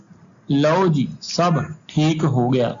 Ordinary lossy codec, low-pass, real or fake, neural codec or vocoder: AAC, 64 kbps; 7.2 kHz; real; none